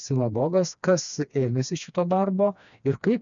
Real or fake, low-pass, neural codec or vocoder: fake; 7.2 kHz; codec, 16 kHz, 2 kbps, FreqCodec, smaller model